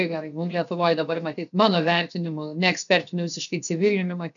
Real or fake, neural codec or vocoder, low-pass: fake; codec, 16 kHz, 0.7 kbps, FocalCodec; 7.2 kHz